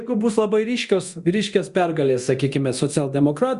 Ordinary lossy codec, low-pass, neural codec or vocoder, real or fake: Opus, 64 kbps; 10.8 kHz; codec, 24 kHz, 0.9 kbps, DualCodec; fake